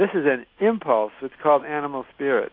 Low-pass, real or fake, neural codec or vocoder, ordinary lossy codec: 5.4 kHz; real; none; AAC, 32 kbps